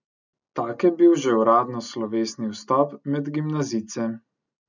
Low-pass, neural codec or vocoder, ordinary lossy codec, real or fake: 7.2 kHz; none; none; real